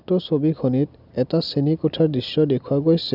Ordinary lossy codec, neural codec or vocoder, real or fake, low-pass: none; none; real; 5.4 kHz